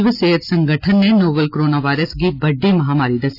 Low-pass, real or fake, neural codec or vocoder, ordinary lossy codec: 5.4 kHz; real; none; AAC, 32 kbps